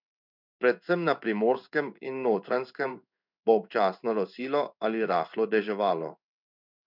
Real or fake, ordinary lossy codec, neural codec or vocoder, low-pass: fake; none; codec, 16 kHz in and 24 kHz out, 1 kbps, XY-Tokenizer; 5.4 kHz